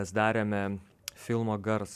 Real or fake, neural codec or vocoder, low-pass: real; none; 14.4 kHz